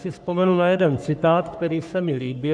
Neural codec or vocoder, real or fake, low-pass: codec, 44.1 kHz, 3.4 kbps, Pupu-Codec; fake; 9.9 kHz